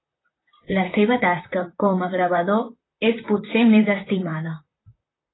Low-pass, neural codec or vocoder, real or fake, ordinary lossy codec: 7.2 kHz; vocoder, 44.1 kHz, 128 mel bands, Pupu-Vocoder; fake; AAC, 16 kbps